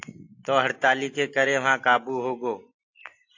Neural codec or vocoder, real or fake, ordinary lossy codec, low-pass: none; real; AAC, 48 kbps; 7.2 kHz